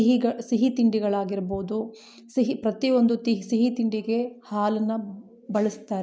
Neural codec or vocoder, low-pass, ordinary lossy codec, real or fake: none; none; none; real